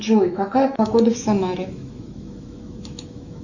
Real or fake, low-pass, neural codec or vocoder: fake; 7.2 kHz; autoencoder, 48 kHz, 128 numbers a frame, DAC-VAE, trained on Japanese speech